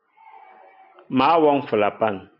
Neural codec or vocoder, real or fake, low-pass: none; real; 5.4 kHz